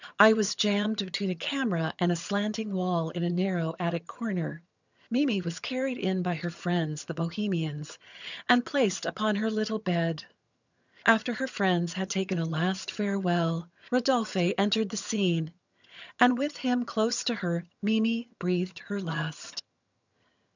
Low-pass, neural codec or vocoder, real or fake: 7.2 kHz; vocoder, 22.05 kHz, 80 mel bands, HiFi-GAN; fake